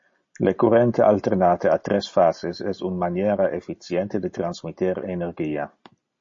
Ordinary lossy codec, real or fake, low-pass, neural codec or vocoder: MP3, 32 kbps; real; 7.2 kHz; none